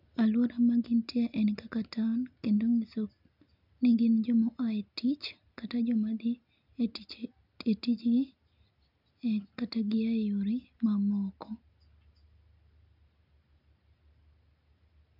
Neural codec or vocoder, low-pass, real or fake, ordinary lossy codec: none; 5.4 kHz; real; none